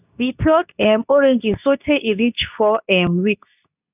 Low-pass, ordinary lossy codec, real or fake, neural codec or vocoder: 3.6 kHz; none; fake; codec, 24 kHz, 0.9 kbps, WavTokenizer, medium speech release version 2